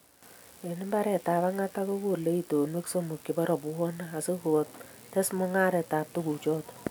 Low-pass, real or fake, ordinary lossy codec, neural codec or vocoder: none; real; none; none